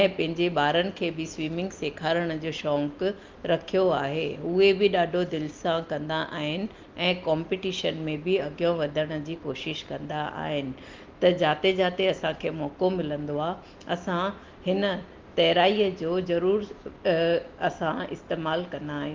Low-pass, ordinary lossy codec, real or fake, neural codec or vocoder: 7.2 kHz; Opus, 32 kbps; real; none